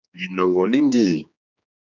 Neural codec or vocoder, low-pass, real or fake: codec, 16 kHz, 2 kbps, X-Codec, HuBERT features, trained on general audio; 7.2 kHz; fake